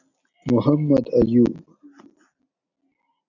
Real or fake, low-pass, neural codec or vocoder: real; 7.2 kHz; none